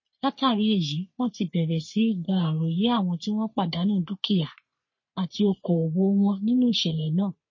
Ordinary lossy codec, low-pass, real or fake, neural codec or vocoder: MP3, 32 kbps; 7.2 kHz; fake; codec, 44.1 kHz, 3.4 kbps, Pupu-Codec